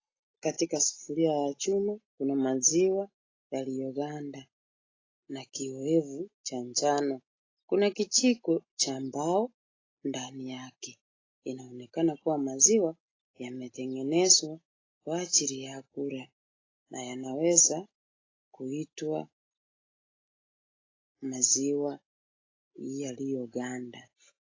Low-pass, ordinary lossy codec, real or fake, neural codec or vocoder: 7.2 kHz; AAC, 32 kbps; real; none